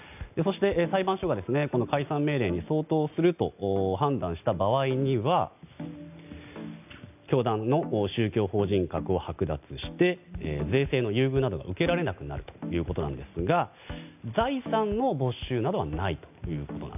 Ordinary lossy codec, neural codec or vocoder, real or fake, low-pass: none; none; real; 3.6 kHz